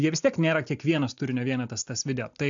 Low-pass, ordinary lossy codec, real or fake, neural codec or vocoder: 7.2 kHz; AAC, 64 kbps; real; none